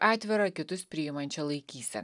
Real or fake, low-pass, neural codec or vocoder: real; 10.8 kHz; none